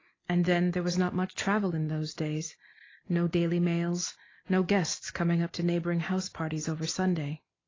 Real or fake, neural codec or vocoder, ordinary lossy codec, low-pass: real; none; AAC, 32 kbps; 7.2 kHz